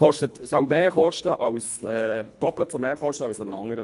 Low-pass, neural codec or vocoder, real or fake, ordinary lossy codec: 10.8 kHz; codec, 24 kHz, 1.5 kbps, HILCodec; fake; none